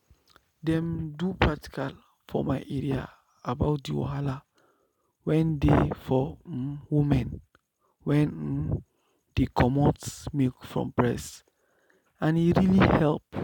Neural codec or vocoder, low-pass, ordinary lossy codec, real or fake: none; 19.8 kHz; none; real